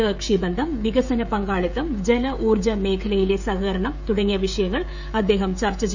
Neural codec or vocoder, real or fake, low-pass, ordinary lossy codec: codec, 16 kHz, 16 kbps, FreqCodec, smaller model; fake; 7.2 kHz; none